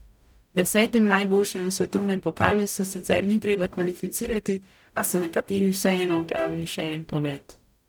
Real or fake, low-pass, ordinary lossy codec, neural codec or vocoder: fake; none; none; codec, 44.1 kHz, 0.9 kbps, DAC